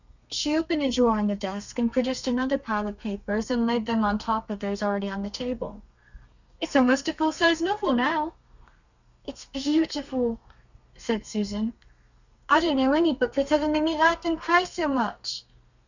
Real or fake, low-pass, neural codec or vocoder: fake; 7.2 kHz; codec, 32 kHz, 1.9 kbps, SNAC